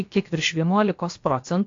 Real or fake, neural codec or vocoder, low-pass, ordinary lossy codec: fake; codec, 16 kHz, about 1 kbps, DyCAST, with the encoder's durations; 7.2 kHz; AAC, 48 kbps